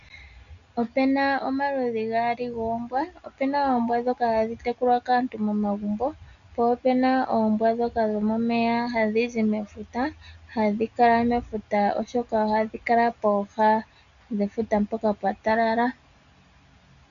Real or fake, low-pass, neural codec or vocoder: real; 7.2 kHz; none